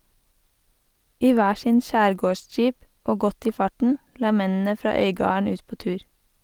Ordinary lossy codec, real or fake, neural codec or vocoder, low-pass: Opus, 24 kbps; real; none; 19.8 kHz